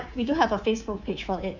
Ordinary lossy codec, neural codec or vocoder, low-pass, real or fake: none; codec, 24 kHz, 3.1 kbps, DualCodec; 7.2 kHz; fake